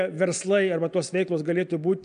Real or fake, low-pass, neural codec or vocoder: real; 9.9 kHz; none